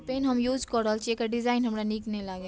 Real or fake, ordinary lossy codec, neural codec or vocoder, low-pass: real; none; none; none